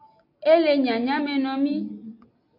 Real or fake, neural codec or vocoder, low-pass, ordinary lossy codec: real; none; 5.4 kHz; AAC, 32 kbps